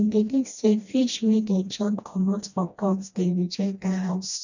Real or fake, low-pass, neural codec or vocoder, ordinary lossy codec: fake; 7.2 kHz; codec, 16 kHz, 1 kbps, FreqCodec, smaller model; none